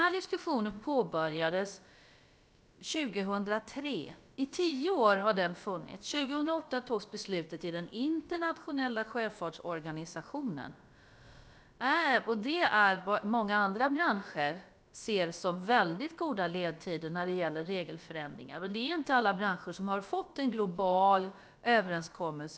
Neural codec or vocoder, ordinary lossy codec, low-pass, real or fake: codec, 16 kHz, about 1 kbps, DyCAST, with the encoder's durations; none; none; fake